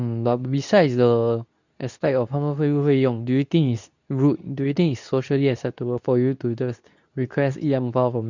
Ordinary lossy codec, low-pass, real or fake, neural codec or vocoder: none; 7.2 kHz; fake; codec, 24 kHz, 0.9 kbps, WavTokenizer, medium speech release version 2